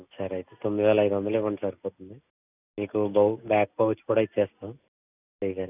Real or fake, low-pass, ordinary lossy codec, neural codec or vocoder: real; 3.6 kHz; none; none